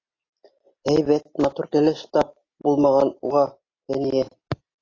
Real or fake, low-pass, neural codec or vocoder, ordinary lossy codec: real; 7.2 kHz; none; AAC, 32 kbps